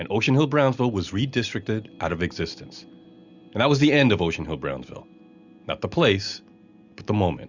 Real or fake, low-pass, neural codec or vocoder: real; 7.2 kHz; none